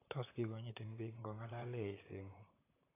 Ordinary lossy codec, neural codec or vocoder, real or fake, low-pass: AAC, 16 kbps; vocoder, 44.1 kHz, 128 mel bands, Pupu-Vocoder; fake; 3.6 kHz